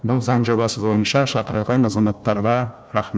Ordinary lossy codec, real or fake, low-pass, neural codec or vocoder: none; fake; none; codec, 16 kHz, 1 kbps, FunCodec, trained on Chinese and English, 50 frames a second